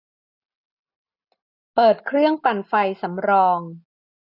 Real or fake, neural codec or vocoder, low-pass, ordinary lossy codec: real; none; 5.4 kHz; none